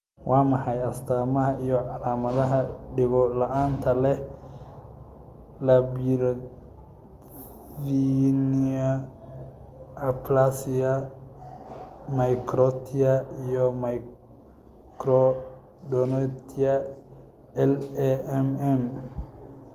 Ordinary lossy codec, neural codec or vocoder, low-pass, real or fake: Opus, 32 kbps; none; 14.4 kHz; real